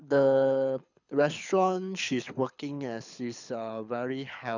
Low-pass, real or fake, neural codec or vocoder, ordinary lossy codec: 7.2 kHz; fake; codec, 24 kHz, 6 kbps, HILCodec; none